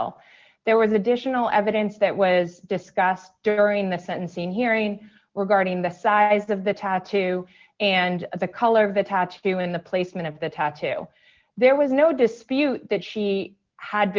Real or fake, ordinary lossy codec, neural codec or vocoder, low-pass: real; Opus, 32 kbps; none; 7.2 kHz